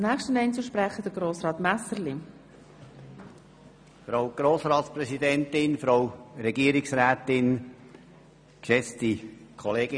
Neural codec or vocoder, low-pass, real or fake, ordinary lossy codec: none; 9.9 kHz; real; none